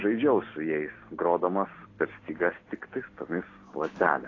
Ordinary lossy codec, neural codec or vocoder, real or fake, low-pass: AAC, 32 kbps; none; real; 7.2 kHz